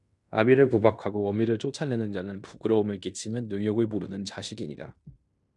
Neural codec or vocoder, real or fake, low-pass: codec, 16 kHz in and 24 kHz out, 0.9 kbps, LongCat-Audio-Codec, fine tuned four codebook decoder; fake; 10.8 kHz